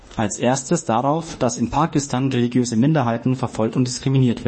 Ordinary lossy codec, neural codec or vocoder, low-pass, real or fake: MP3, 32 kbps; autoencoder, 48 kHz, 32 numbers a frame, DAC-VAE, trained on Japanese speech; 10.8 kHz; fake